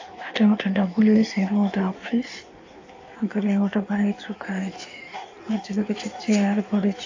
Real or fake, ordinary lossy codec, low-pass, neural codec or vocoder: fake; AAC, 48 kbps; 7.2 kHz; codec, 16 kHz in and 24 kHz out, 1.1 kbps, FireRedTTS-2 codec